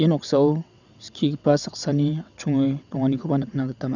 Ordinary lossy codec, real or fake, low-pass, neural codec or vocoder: none; fake; 7.2 kHz; vocoder, 22.05 kHz, 80 mel bands, WaveNeXt